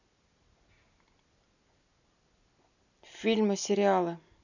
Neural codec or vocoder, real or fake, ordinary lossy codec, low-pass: none; real; none; 7.2 kHz